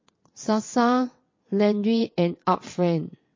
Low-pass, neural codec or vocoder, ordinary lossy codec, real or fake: 7.2 kHz; vocoder, 22.05 kHz, 80 mel bands, WaveNeXt; MP3, 32 kbps; fake